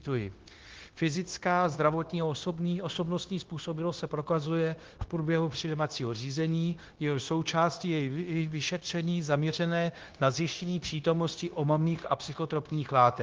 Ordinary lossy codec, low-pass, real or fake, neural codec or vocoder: Opus, 16 kbps; 7.2 kHz; fake; codec, 16 kHz, 0.9 kbps, LongCat-Audio-Codec